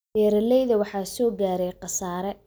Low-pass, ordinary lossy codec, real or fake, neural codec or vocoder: none; none; real; none